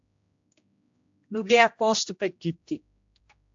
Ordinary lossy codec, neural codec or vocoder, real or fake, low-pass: AAC, 64 kbps; codec, 16 kHz, 1 kbps, X-Codec, HuBERT features, trained on general audio; fake; 7.2 kHz